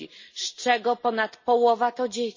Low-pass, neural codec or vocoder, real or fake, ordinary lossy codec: 7.2 kHz; none; real; MP3, 32 kbps